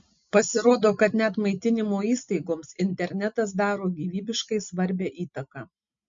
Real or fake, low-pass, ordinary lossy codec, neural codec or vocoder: real; 7.2 kHz; MP3, 48 kbps; none